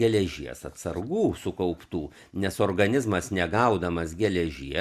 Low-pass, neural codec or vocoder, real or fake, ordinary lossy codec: 14.4 kHz; none; real; Opus, 64 kbps